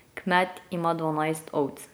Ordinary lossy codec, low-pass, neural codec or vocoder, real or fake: none; none; none; real